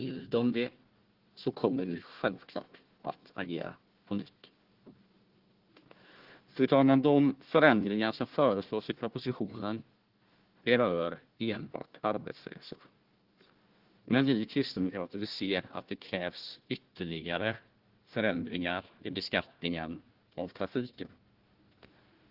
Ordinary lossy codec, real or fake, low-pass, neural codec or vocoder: Opus, 16 kbps; fake; 5.4 kHz; codec, 16 kHz, 1 kbps, FunCodec, trained on Chinese and English, 50 frames a second